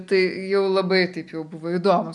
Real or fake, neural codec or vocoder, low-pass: real; none; 10.8 kHz